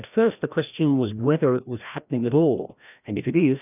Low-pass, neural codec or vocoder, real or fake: 3.6 kHz; codec, 16 kHz, 1 kbps, FreqCodec, larger model; fake